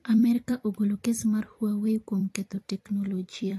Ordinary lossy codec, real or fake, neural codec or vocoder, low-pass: AAC, 48 kbps; fake; vocoder, 44.1 kHz, 128 mel bands every 256 samples, BigVGAN v2; 14.4 kHz